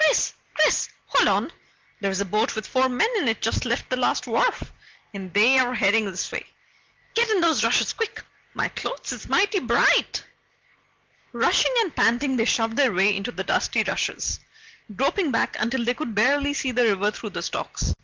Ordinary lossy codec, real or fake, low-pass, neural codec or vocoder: Opus, 16 kbps; real; 7.2 kHz; none